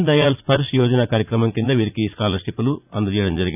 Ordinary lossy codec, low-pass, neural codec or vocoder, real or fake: none; 3.6 kHz; vocoder, 44.1 kHz, 80 mel bands, Vocos; fake